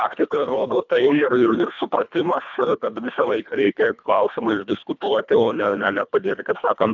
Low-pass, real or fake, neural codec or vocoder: 7.2 kHz; fake; codec, 24 kHz, 1.5 kbps, HILCodec